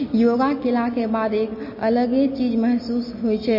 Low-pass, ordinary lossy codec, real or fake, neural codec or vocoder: 5.4 kHz; MP3, 24 kbps; real; none